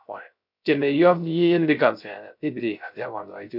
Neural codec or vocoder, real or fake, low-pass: codec, 16 kHz, 0.3 kbps, FocalCodec; fake; 5.4 kHz